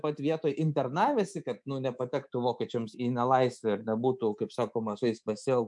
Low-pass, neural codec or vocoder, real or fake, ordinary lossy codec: 10.8 kHz; codec, 24 kHz, 3.1 kbps, DualCodec; fake; MP3, 64 kbps